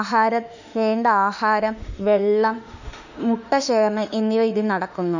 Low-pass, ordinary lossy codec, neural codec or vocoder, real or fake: 7.2 kHz; none; autoencoder, 48 kHz, 32 numbers a frame, DAC-VAE, trained on Japanese speech; fake